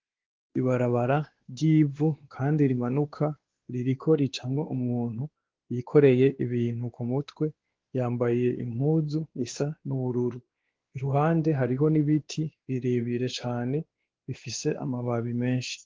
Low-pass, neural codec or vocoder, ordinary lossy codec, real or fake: 7.2 kHz; codec, 16 kHz, 2 kbps, X-Codec, WavLM features, trained on Multilingual LibriSpeech; Opus, 16 kbps; fake